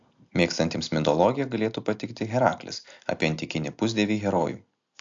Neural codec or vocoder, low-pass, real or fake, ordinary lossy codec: none; 7.2 kHz; real; AAC, 64 kbps